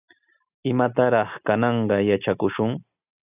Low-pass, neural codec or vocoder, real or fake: 3.6 kHz; none; real